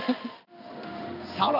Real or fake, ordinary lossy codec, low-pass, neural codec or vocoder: fake; none; 5.4 kHz; codec, 16 kHz in and 24 kHz out, 1 kbps, XY-Tokenizer